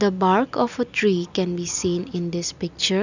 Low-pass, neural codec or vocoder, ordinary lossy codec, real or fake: 7.2 kHz; none; none; real